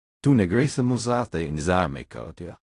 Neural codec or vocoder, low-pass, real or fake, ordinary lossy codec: codec, 16 kHz in and 24 kHz out, 0.4 kbps, LongCat-Audio-Codec, fine tuned four codebook decoder; 10.8 kHz; fake; AAC, 48 kbps